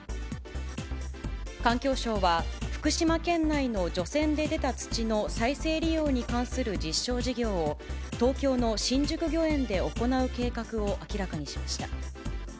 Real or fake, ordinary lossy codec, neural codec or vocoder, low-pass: real; none; none; none